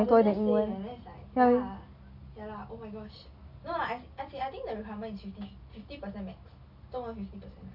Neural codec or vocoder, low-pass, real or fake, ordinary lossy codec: none; 5.4 kHz; real; none